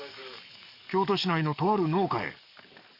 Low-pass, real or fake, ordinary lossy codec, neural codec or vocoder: 5.4 kHz; fake; none; codec, 44.1 kHz, 7.8 kbps, DAC